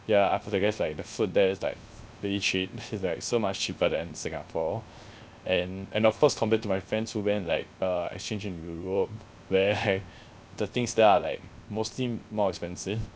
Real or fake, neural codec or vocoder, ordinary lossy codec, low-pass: fake; codec, 16 kHz, 0.3 kbps, FocalCodec; none; none